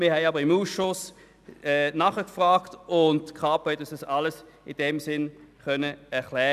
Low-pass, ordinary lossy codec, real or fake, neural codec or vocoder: 14.4 kHz; none; real; none